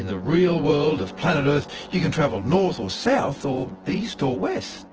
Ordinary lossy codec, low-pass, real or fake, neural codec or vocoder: Opus, 16 kbps; 7.2 kHz; fake; vocoder, 24 kHz, 100 mel bands, Vocos